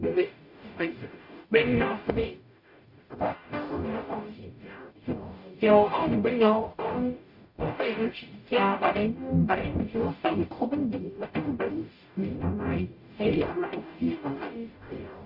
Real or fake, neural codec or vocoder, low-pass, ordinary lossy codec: fake; codec, 44.1 kHz, 0.9 kbps, DAC; 5.4 kHz; none